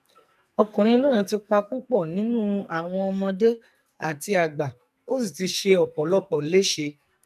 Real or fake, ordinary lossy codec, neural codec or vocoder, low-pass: fake; none; codec, 44.1 kHz, 2.6 kbps, SNAC; 14.4 kHz